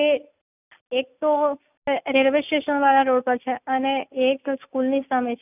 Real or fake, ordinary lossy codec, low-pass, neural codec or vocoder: real; none; 3.6 kHz; none